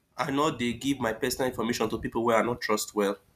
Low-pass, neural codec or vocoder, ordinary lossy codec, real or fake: 14.4 kHz; none; none; real